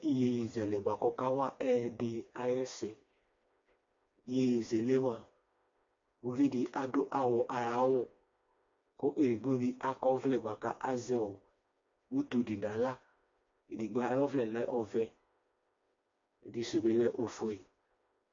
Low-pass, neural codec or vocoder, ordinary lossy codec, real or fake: 7.2 kHz; codec, 16 kHz, 2 kbps, FreqCodec, smaller model; MP3, 48 kbps; fake